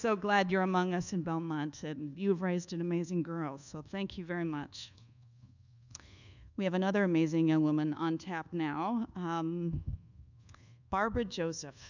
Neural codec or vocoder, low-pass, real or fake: codec, 24 kHz, 1.2 kbps, DualCodec; 7.2 kHz; fake